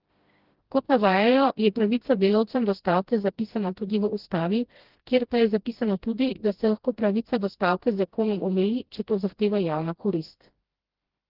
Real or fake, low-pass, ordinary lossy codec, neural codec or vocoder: fake; 5.4 kHz; Opus, 16 kbps; codec, 16 kHz, 1 kbps, FreqCodec, smaller model